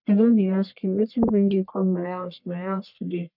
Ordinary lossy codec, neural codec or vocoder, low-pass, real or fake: none; codec, 44.1 kHz, 1.7 kbps, Pupu-Codec; 5.4 kHz; fake